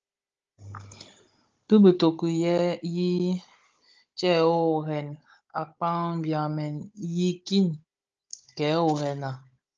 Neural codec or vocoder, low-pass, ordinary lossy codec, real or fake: codec, 16 kHz, 16 kbps, FunCodec, trained on Chinese and English, 50 frames a second; 7.2 kHz; Opus, 24 kbps; fake